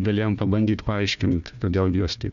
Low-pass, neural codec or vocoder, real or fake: 7.2 kHz; codec, 16 kHz, 1 kbps, FunCodec, trained on Chinese and English, 50 frames a second; fake